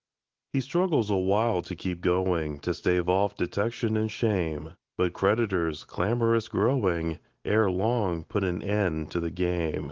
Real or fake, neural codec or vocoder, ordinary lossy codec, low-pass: real; none; Opus, 16 kbps; 7.2 kHz